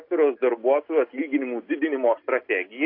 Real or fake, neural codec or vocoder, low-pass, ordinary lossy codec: real; none; 5.4 kHz; AAC, 32 kbps